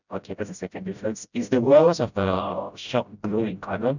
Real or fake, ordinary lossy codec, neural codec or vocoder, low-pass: fake; none; codec, 16 kHz, 0.5 kbps, FreqCodec, smaller model; 7.2 kHz